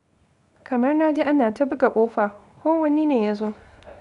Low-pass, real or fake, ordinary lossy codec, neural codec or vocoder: 10.8 kHz; fake; none; codec, 24 kHz, 0.9 kbps, WavTokenizer, small release